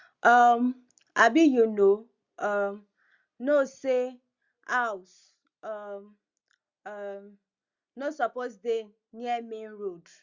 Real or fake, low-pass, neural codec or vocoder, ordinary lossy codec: real; 7.2 kHz; none; Opus, 64 kbps